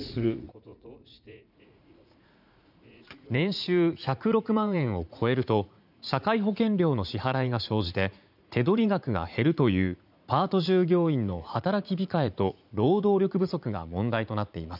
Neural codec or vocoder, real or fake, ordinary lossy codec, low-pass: vocoder, 44.1 kHz, 80 mel bands, Vocos; fake; none; 5.4 kHz